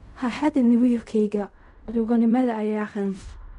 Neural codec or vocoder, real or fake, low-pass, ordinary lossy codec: codec, 16 kHz in and 24 kHz out, 0.4 kbps, LongCat-Audio-Codec, fine tuned four codebook decoder; fake; 10.8 kHz; none